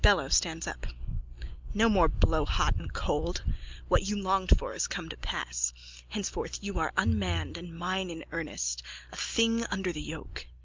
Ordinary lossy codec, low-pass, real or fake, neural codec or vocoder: Opus, 24 kbps; 7.2 kHz; real; none